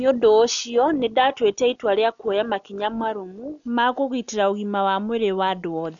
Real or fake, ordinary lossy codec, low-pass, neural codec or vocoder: real; none; 7.2 kHz; none